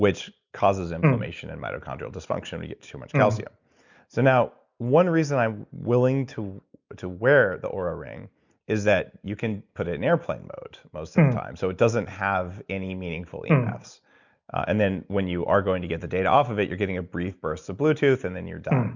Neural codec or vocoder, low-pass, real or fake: none; 7.2 kHz; real